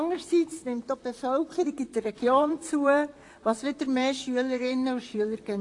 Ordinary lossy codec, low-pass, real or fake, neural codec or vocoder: AAC, 48 kbps; 10.8 kHz; fake; vocoder, 44.1 kHz, 128 mel bands, Pupu-Vocoder